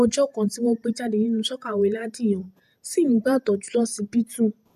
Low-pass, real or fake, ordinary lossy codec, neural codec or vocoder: 14.4 kHz; fake; MP3, 96 kbps; vocoder, 48 kHz, 128 mel bands, Vocos